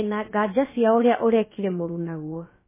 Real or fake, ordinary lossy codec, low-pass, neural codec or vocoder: fake; MP3, 16 kbps; 3.6 kHz; codec, 16 kHz, about 1 kbps, DyCAST, with the encoder's durations